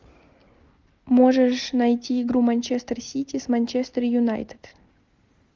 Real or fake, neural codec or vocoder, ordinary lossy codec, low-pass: real; none; Opus, 32 kbps; 7.2 kHz